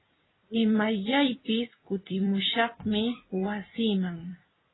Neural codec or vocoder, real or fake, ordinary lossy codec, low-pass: vocoder, 44.1 kHz, 128 mel bands every 256 samples, BigVGAN v2; fake; AAC, 16 kbps; 7.2 kHz